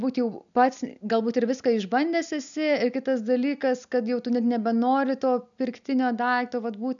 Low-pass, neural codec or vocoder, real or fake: 7.2 kHz; none; real